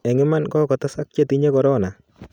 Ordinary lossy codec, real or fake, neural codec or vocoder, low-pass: none; real; none; 19.8 kHz